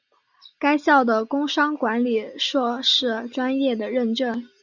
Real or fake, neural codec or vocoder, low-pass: real; none; 7.2 kHz